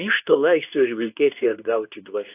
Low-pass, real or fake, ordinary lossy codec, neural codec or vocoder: 3.6 kHz; fake; AAC, 24 kbps; codec, 24 kHz, 0.9 kbps, WavTokenizer, medium speech release version 2